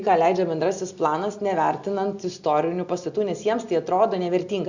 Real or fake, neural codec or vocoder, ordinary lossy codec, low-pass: real; none; Opus, 64 kbps; 7.2 kHz